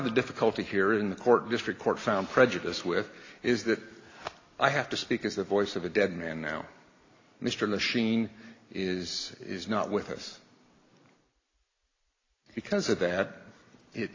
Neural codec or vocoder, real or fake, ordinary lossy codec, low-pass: none; real; AAC, 32 kbps; 7.2 kHz